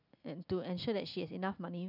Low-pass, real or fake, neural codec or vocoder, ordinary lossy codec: 5.4 kHz; real; none; none